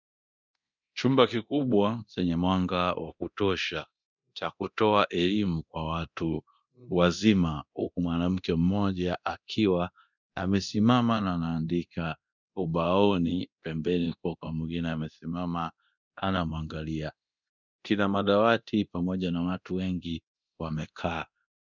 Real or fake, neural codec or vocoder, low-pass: fake; codec, 24 kHz, 0.9 kbps, DualCodec; 7.2 kHz